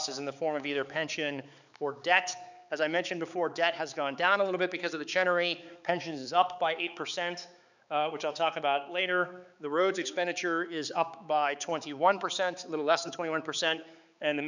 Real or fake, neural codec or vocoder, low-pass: fake; codec, 16 kHz, 4 kbps, X-Codec, HuBERT features, trained on balanced general audio; 7.2 kHz